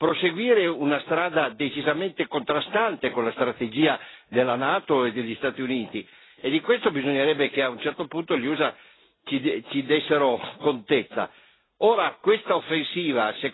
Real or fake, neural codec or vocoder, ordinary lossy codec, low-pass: real; none; AAC, 16 kbps; 7.2 kHz